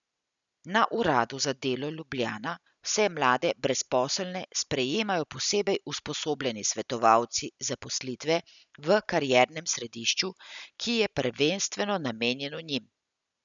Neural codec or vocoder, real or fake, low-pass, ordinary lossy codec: none; real; 7.2 kHz; none